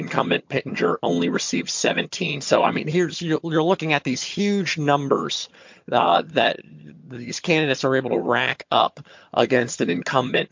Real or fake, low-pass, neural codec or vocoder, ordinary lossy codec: fake; 7.2 kHz; vocoder, 22.05 kHz, 80 mel bands, HiFi-GAN; MP3, 48 kbps